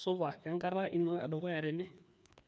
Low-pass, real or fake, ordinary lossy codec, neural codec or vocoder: none; fake; none; codec, 16 kHz, 2 kbps, FreqCodec, larger model